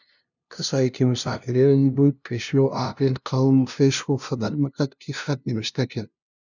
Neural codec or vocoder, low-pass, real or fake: codec, 16 kHz, 0.5 kbps, FunCodec, trained on LibriTTS, 25 frames a second; 7.2 kHz; fake